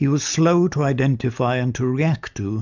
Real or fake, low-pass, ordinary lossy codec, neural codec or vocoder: real; 7.2 kHz; MP3, 64 kbps; none